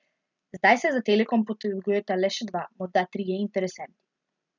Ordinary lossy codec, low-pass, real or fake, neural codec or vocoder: none; 7.2 kHz; real; none